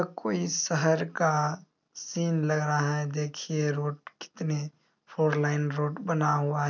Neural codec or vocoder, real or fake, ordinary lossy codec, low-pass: none; real; none; 7.2 kHz